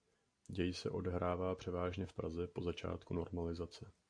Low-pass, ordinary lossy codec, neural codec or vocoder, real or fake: 9.9 kHz; AAC, 64 kbps; none; real